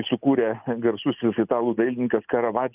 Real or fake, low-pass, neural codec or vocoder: real; 3.6 kHz; none